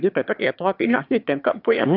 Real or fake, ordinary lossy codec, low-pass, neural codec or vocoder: fake; AAC, 48 kbps; 5.4 kHz; autoencoder, 22.05 kHz, a latent of 192 numbers a frame, VITS, trained on one speaker